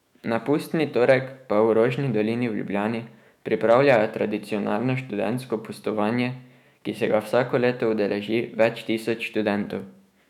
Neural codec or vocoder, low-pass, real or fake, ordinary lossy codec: autoencoder, 48 kHz, 128 numbers a frame, DAC-VAE, trained on Japanese speech; 19.8 kHz; fake; none